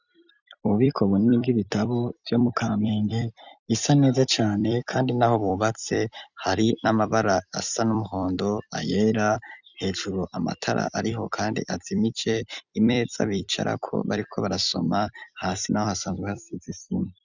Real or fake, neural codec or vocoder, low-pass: fake; vocoder, 44.1 kHz, 128 mel bands every 256 samples, BigVGAN v2; 7.2 kHz